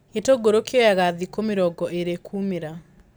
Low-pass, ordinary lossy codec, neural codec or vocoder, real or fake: none; none; none; real